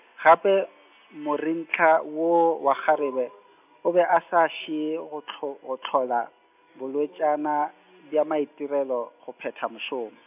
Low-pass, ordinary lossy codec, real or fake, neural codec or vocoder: 3.6 kHz; AAC, 32 kbps; real; none